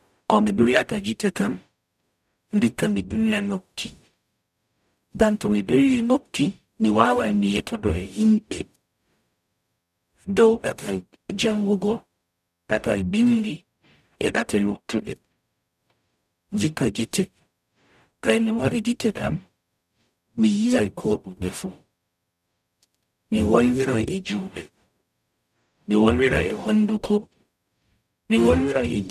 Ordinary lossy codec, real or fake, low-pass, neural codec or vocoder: none; fake; 14.4 kHz; codec, 44.1 kHz, 0.9 kbps, DAC